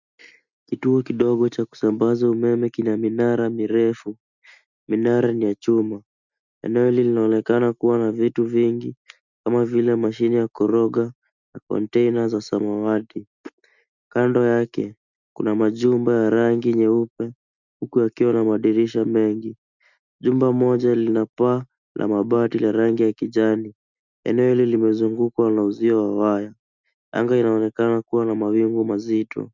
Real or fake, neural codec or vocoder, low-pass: real; none; 7.2 kHz